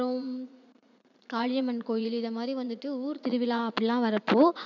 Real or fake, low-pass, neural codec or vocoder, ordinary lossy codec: fake; 7.2 kHz; vocoder, 44.1 kHz, 80 mel bands, Vocos; none